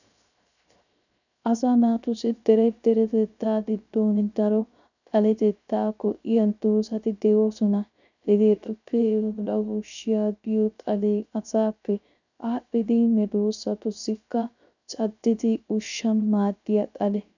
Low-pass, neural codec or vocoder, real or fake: 7.2 kHz; codec, 16 kHz, 0.3 kbps, FocalCodec; fake